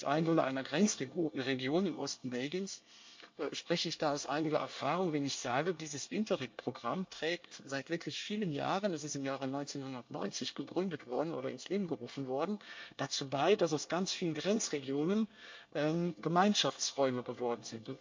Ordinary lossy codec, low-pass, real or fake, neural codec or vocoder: MP3, 48 kbps; 7.2 kHz; fake; codec, 24 kHz, 1 kbps, SNAC